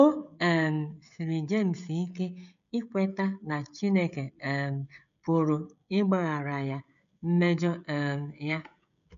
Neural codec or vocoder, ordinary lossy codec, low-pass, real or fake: codec, 16 kHz, 16 kbps, FreqCodec, smaller model; none; 7.2 kHz; fake